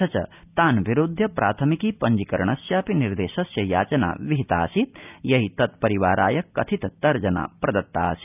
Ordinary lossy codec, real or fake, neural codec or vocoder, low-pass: none; real; none; 3.6 kHz